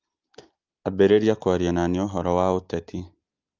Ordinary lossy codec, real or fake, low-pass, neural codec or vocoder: Opus, 32 kbps; real; 7.2 kHz; none